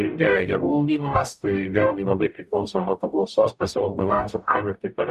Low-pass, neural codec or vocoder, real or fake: 14.4 kHz; codec, 44.1 kHz, 0.9 kbps, DAC; fake